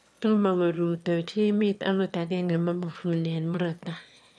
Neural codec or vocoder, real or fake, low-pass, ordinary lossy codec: autoencoder, 22.05 kHz, a latent of 192 numbers a frame, VITS, trained on one speaker; fake; none; none